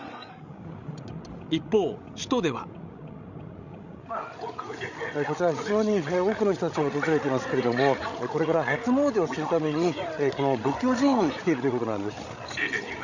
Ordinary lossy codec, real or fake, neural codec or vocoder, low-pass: none; fake; codec, 16 kHz, 16 kbps, FreqCodec, larger model; 7.2 kHz